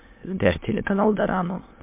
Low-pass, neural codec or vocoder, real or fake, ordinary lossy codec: 3.6 kHz; autoencoder, 22.05 kHz, a latent of 192 numbers a frame, VITS, trained on many speakers; fake; MP3, 24 kbps